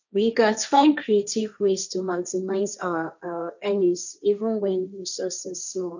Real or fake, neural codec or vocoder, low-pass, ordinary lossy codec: fake; codec, 16 kHz, 1.1 kbps, Voila-Tokenizer; 7.2 kHz; none